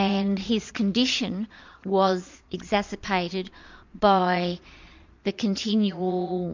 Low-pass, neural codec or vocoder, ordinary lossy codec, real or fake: 7.2 kHz; vocoder, 22.05 kHz, 80 mel bands, Vocos; MP3, 64 kbps; fake